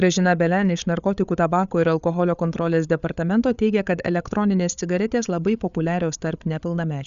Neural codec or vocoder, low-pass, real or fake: codec, 16 kHz, 8 kbps, FreqCodec, larger model; 7.2 kHz; fake